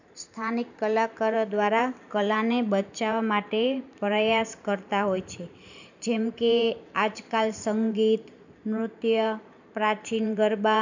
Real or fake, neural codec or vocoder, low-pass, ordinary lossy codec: fake; vocoder, 44.1 kHz, 128 mel bands every 512 samples, BigVGAN v2; 7.2 kHz; none